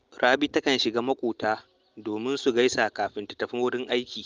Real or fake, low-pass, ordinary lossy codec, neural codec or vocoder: real; 7.2 kHz; Opus, 32 kbps; none